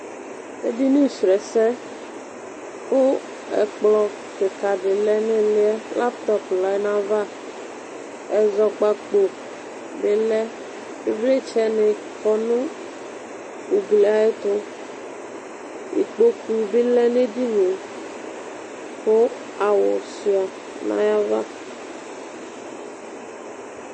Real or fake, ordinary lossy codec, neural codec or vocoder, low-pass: real; MP3, 32 kbps; none; 9.9 kHz